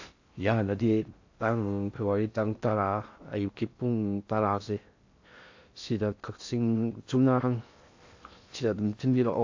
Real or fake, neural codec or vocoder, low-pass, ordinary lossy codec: fake; codec, 16 kHz in and 24 kHz out, 0.6 kbps, FocalCodec, streaming, 4096 codes; 7.2 kHz; AAC, 48 kbps